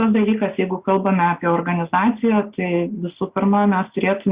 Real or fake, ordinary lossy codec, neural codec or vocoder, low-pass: real; Opus, 64 kbps; none; 3.6 kHz